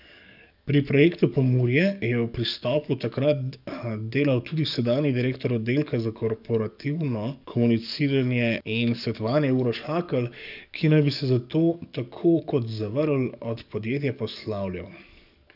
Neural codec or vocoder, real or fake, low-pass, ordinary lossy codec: codec, 44.1 kHz, 7.8 kbps, DAC; fake; 5.4 kHz; none